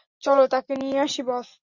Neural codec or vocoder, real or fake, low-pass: none; real; 7.2 kHz